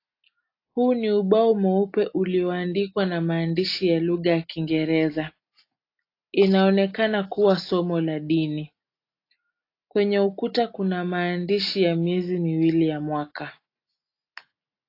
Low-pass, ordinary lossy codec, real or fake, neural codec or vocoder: 5.4 kHz; AAC, 32 kbps; real; none